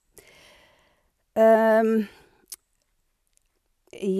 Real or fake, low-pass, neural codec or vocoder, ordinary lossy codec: real; 14.4 kHz; none; none